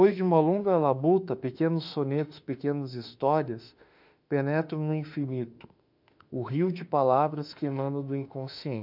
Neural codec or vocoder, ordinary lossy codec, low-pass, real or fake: autoencoder, 48 kHz, 32 numbers a frame, DAC-VAE, trained on Japanese speech; none; 5.4 kHz; fake